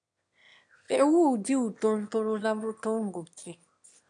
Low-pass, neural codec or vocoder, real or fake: 9.9 kHz; autoencoder, 22.05 kHz, a latent of 192 numbers a frame, VITS, trained on one speaker; fake